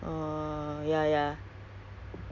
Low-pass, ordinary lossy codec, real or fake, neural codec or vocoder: 7.2 kHz; Opus, 64 kbps; real; none